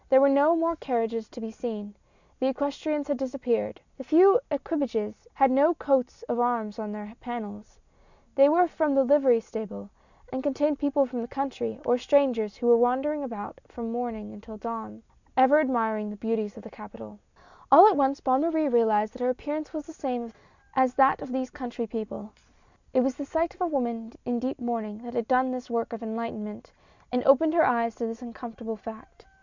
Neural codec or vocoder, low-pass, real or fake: none; 7.2 kHz; real